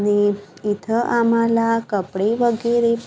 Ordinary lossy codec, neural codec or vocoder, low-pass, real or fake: none; none; none; real